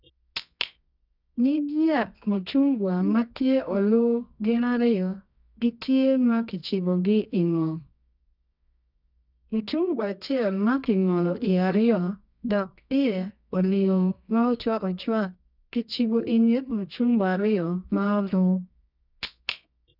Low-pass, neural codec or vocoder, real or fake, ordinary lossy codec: 5.4 kHz; codec, 24 kHz, 0.9 kbps, WavTokenizer, medium music audio release; fake; none